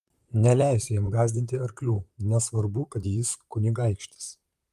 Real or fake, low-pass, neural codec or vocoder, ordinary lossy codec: fake; 14.4 kHz; vocoder, 44.1 kHz, 128 mel bands, Pupu-Vocoder; Opus, 32 kbps